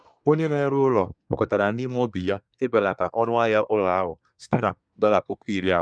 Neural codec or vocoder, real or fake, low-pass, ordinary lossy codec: codec, 24 kHz, 1 kbps, SNAC; fake; 9.9 kHz; none